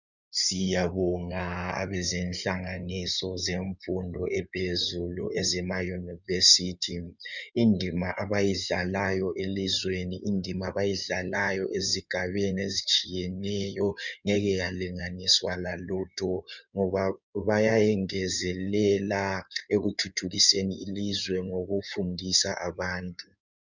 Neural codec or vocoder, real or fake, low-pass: codec, 16 kHz in and 24 kHz out, 2.2 kbps, FireRedTTS-2 codec; fake; 7.2 kHz